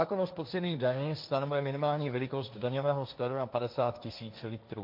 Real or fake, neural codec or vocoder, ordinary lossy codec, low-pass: fake; codec, 16 kHz, 1.1 kbps, Voila-Tokenizer; MP3, 48 kbps; 5.4 kHz